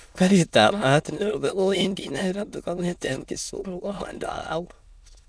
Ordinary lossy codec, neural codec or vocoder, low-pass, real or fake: none; autoencoder, 22.05 kHz, a latent of 192 numbers a frame, VITS, trained on many speakers; none; fake